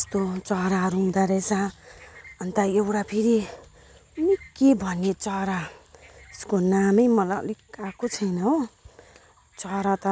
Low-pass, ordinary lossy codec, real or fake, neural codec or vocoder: none; none; real; none